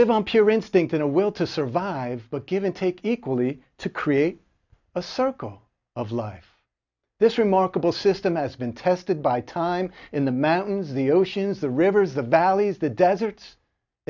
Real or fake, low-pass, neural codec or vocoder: real; 7.2 kHz; none